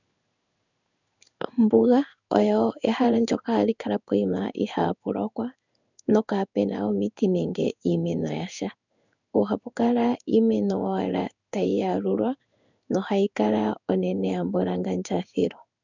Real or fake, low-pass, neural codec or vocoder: fake; 7.2 kHz; codec, 16 kHz in and 24 kHz out, 1 kbps, XY-Tokenizer